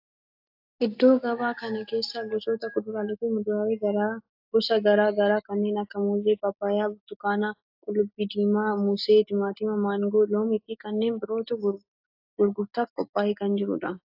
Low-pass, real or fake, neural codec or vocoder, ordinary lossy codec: 5.4 kHz; real; none; AAC, 48 kbps